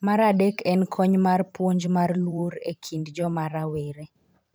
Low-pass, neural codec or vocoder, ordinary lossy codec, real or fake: none; vocoder, 44.1 kHz, 128 mel bands every 512 samples, BigVGAN v2; none; fake